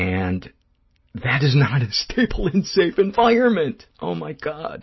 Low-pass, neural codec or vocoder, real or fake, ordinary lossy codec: 7.2 kHz; none; real; MP3, 24 kbps